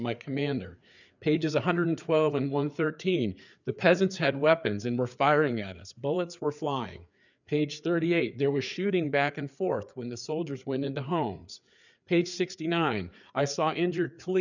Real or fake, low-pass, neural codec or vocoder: fake; 7.2 kHz; codec, 16 kHz, 4 kbps, FreqCodec, larger model